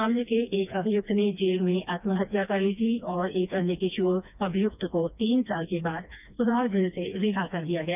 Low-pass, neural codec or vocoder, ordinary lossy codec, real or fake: 3.6 kHz; codec, 16 kHz, 2 kbps, FreqCodec, smaller model; none; fake